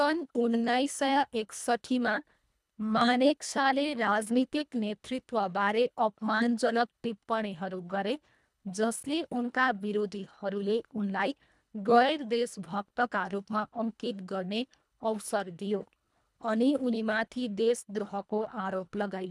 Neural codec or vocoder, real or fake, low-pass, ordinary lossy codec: codec, 24 kHz, 1.5 kbps, HILCodec; fake; none; none